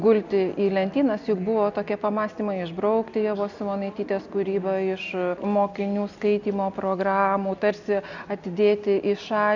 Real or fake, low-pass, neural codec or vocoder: real; 7.2 kHz; none